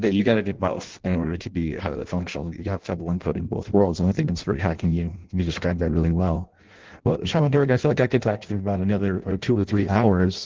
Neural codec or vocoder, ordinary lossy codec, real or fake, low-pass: codec, 16 kHz in and 24 kHz out, 0.6 kbps, FireRedTTS-2 codec; Opus, 16 kbps; fake; 7.2 kHz